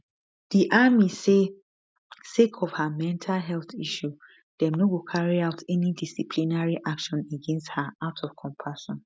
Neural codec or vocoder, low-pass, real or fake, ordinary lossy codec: none; none; real; none